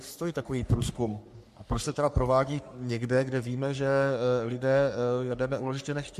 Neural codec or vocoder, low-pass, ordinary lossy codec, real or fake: codec, 44.1 kHz, 3.4 kbps, Pupu-Codec; 14.4 kHz; MP3, 64 kbps; fake